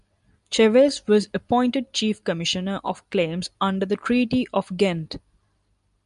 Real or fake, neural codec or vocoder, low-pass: real; none; 10.8 kHz